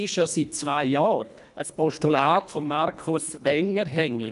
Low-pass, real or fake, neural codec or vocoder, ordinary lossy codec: 10.8 kHz; fake; codec, 24 kHz, 1.5 kbps, HILCodec; none